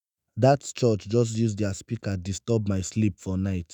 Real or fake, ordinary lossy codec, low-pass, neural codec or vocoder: fake; none; none; autoencoder, 48 kHz, 128 numbers a frame, DAC-VAE, trained on Japanese speech